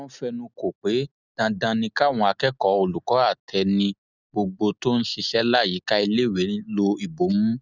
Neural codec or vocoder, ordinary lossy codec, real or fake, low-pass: none; none; real; 7.2 kHz